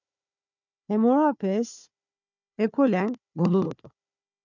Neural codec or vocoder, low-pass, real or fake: codec, 16 kHz, 4 kbps, FunCodec, trained on Chinese and English, 50 frames a second; 7.2 kHz; fake